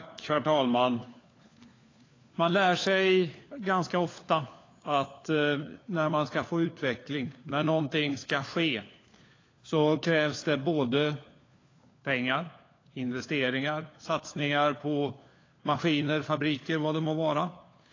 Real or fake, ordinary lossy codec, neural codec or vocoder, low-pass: fake; AAC, 32 kbps; codec, 16 kHz, 4 kbps, FunCodec, trained on LibriTTS, 50 frames a second; 7.2 kHz